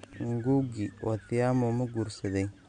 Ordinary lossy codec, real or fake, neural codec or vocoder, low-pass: none; real; none; 9.9 kHz